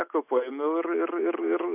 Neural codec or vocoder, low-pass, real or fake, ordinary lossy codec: none; 3.6 kHz; real; AAC, 24 kbps